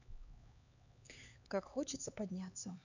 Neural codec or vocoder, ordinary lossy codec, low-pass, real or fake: codec, 16 kHz, 4 kbps, X-Codec, HuBERT features, trained on LibriSpeech; none; 7.2 kHz; fake